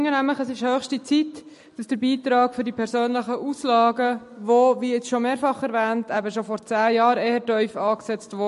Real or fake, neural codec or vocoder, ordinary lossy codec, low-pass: real; none; MP3, 48 kbps; 14.4 kHz